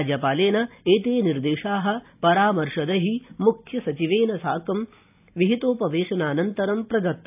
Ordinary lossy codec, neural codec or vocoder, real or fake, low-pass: AAC, 32 kbps; none; real; 3.6 kHz